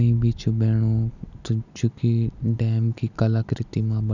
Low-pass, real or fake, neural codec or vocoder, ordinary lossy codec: 7.2 kHz; real; none; none